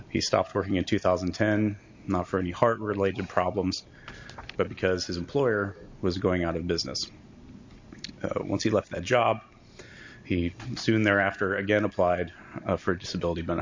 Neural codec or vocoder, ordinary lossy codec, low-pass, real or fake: none; MP3, 48 kbps; 7.2 kHz; real